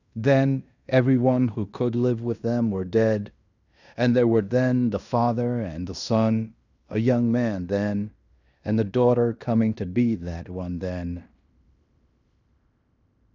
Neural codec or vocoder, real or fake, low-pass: codec, 16 kHz in and 24 kHz out, 0.9 kbps, LongCat-Audio-Codec, fine tuned four codebook decoder; fake; 7.2 kHz